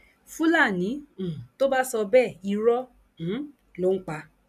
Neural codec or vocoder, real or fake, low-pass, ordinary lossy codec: none; real; 14.4 kHz; none